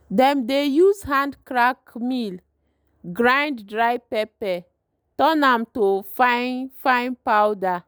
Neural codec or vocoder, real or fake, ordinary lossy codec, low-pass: none; real; none; none